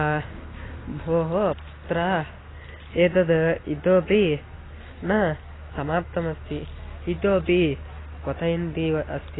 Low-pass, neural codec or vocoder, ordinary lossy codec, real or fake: 7.2 kHz; none; AAC, 16 kbps; real